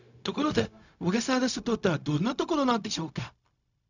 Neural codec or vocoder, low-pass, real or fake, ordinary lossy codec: codec, 16 kHz, 0.4 kbps, LongCat-Audio-Codec; 7.2 kHz; fake; none